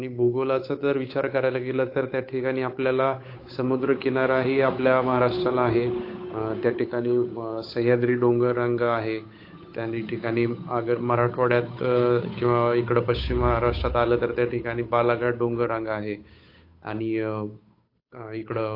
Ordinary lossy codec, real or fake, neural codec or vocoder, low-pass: AAC, 32 kbps; fake; codec, 16 kHz, 8 kbps, FunCodec, trained on Chinese and English, 25 frames a second; 5.4 kHz